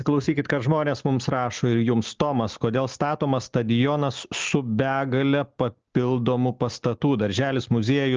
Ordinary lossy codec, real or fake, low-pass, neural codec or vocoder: Opus, 24 kbps; real; 7.2 kHz; none